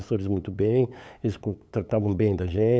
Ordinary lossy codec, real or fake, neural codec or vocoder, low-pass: none; fake; codec, 16 kHz, 8 kbps, FunCodec, trained on LibriTTS, 25 frames a second; none